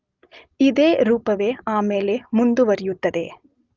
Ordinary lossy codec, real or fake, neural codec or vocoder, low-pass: Opus, 24 kbps; real; none; 7.2 kHz